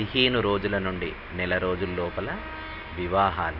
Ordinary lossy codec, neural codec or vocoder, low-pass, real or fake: MP3, 48 kbps; none; 5.4 kHz; real